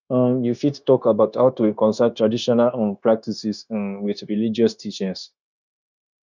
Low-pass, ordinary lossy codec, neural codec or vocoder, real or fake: 7.2 kHz; none; codec, 16 kHz, 0.9 kbps, LongCat-Audio-Codec; fake